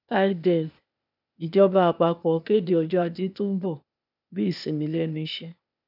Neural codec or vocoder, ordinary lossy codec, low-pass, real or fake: codec, 16 kHz, 0.8 kbps, ZipCodec; none; 5.4 kHz; fake